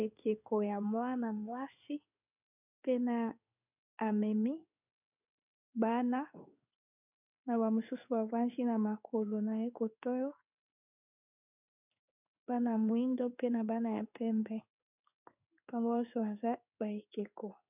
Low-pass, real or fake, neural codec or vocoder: 3.6 kHz; fake; codec, 16 kHz in and 24 kHz out, 1 kbps, XY-Tokenizer